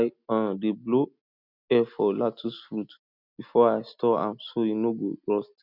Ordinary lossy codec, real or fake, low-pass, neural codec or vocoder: none; real; 5.4 kHz; none